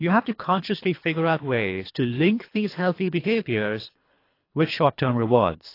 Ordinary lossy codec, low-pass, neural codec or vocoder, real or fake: AAC, 32 kbps; 5.4 kHz; codec, 24 kHz, 3 kbps, HILCodec; fake